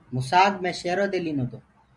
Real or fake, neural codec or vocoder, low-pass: real; none; 10.8 kHz